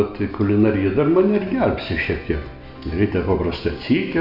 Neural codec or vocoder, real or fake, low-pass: none; real; 5.4 kHz